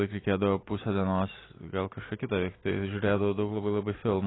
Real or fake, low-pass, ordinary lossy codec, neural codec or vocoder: real; 7.2 kHz; AAC, 16 kbps; none